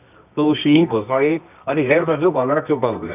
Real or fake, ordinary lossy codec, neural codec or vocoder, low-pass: fake; none; codec, 24 kHz, 0.9 kbps, WavTokenizer, medium music audio release; 3.6 kHz